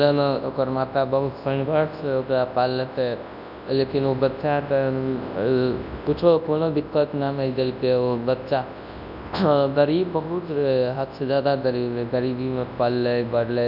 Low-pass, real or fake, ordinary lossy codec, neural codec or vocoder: 5.4 kHz; fake; none; codec, 24 kHz, 0.9 kbps, WavTokenizer, large speech release